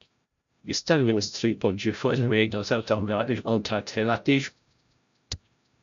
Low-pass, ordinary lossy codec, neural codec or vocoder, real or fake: 7.2 kHz; MP3, 64 kbps; codec, 16 kHz, 0.5 kbps, FreqCodec, larger model; fake